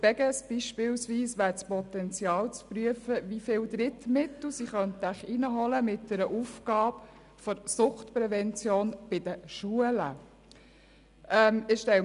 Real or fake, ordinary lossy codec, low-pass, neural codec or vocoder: real; none; 10.8 kHz; none